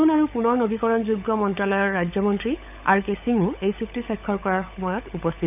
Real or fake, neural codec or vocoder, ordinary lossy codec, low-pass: fake; codec, 16 kHz, 16 kbps, FunCodec, trained on LibriTTS, 50 frames a second; none; 3.6 kHz